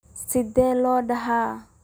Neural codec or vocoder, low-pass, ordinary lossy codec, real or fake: none; none; none; real